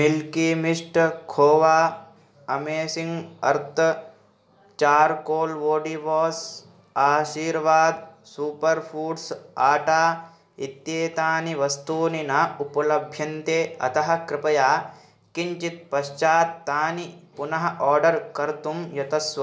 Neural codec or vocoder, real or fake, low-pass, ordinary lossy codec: none; real; none; none